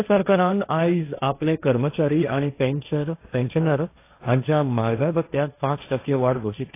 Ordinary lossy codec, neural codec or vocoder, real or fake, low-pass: AAC, 24 kbps; codec, 16 kHz, 1.1 kbps, Voila-Tokenizer; fake; 3.6 kHz